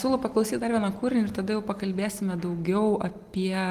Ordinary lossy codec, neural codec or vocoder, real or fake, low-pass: Opus, 24 kbps; none; real; 14.4 kHz